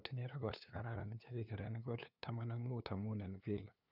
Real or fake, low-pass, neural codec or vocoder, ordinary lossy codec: fake; 5.4 kHz; codec, 16 kHz, 2 kbps, FunCodec, trained on LibriTTS, 25 frames a second; none